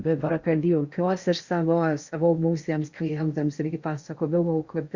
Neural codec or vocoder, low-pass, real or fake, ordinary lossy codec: codec, 16 kHz in and 24 kHz out, 0.6 kbps, FocalCodec, streaming, 4096 codes; 7.2 kHz; fake; Opus, 64 kbps